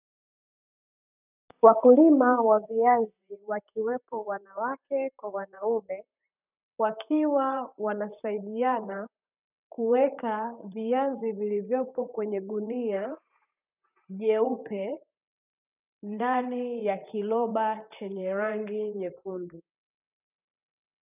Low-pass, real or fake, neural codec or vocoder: 3.6 kHz; fake; vocoder, 44.1 kHz, 128 mel bands, Pupu-Vocoder